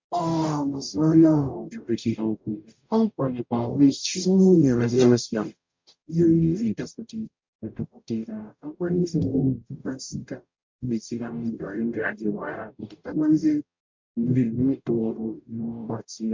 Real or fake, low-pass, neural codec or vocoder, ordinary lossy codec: fake; 7.2 kHz; codec, 44.1 kHz, 0.9 kbps, DAC; MP3, 48 kbps